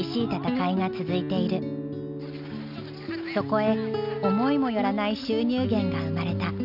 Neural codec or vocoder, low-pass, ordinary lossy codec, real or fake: none; 5.4 kHz; none; real